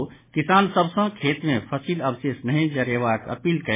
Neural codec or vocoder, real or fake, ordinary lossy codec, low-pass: none; real; MP3, 16 kbps; 3.6 kHz